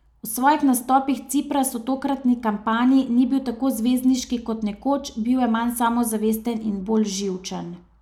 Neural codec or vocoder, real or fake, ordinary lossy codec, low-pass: none; real; none; 19.8 kHz